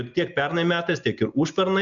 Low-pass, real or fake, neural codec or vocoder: 7.2 kHz; real; none